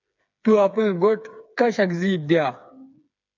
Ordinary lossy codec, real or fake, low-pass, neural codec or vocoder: MP3, 64 kbps; fake; 7.2 kHz; codec, 16 kHz, 4 kbps, FreqCodec, smaller model